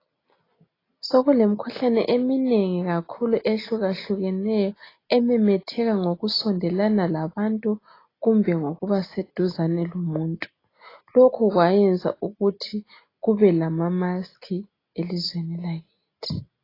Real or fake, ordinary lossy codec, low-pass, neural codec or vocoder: real; AAC, 24 kbps; 5.4 kHz; none